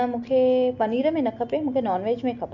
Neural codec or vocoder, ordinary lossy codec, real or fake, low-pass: none; none; real; 7.2 kHz